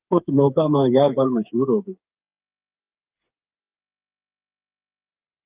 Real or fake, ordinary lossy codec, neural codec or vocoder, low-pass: fake; Opus, 24 kbps; codec, 16 kHz, 4 kbps, FreqCodec, smaller model; 3.6 kHz